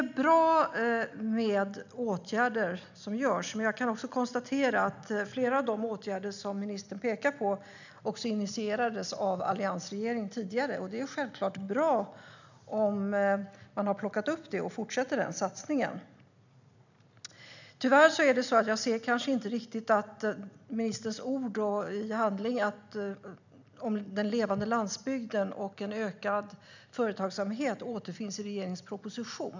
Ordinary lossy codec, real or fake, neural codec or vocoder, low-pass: none; real; none; 7.2 kHz